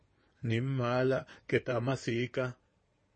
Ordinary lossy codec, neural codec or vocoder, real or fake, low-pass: MP3, 32 kbps; codec, 16 kHz in and 24 kHz out, 2.2 kbps, FireRedTTS-2 codec; fake; 9.9 kHz